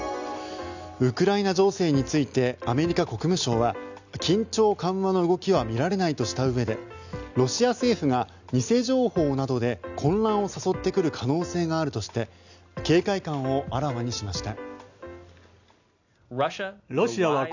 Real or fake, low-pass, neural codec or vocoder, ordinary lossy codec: real; 7.2 kHz; none; none